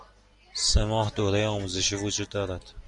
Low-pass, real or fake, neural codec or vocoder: 10.8 kHz; real; none